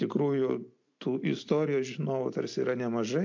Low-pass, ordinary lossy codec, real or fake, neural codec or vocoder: 7.2 kHz; MP3, 48 kbps; real; none